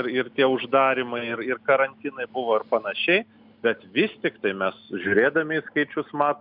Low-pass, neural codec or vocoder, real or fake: 5.4 kHz; none; real